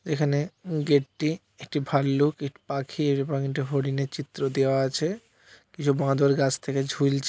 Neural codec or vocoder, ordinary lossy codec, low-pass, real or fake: none; none; none; real